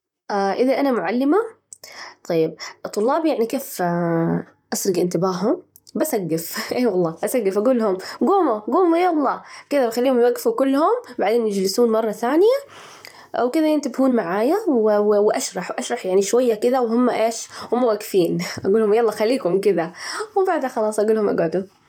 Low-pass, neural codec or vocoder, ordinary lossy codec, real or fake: 19.8 kHz; vocoder, 44.1 kHz, 128 mel bands, Pupu-Vocoder; none; fake